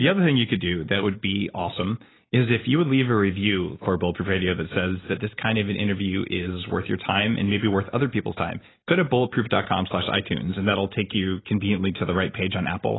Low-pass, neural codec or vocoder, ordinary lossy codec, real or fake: 7.2 kHz; none; AAC, 16 kbps; real